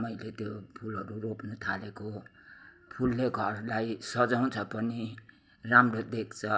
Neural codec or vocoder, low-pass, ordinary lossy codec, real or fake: none; none; none; real